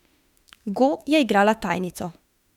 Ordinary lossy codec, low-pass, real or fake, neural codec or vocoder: none; 19.8 kHz; fake; autoencoder, 48 kHz, 32 numbers a frame, DAC-VAE, trained on Japanese speech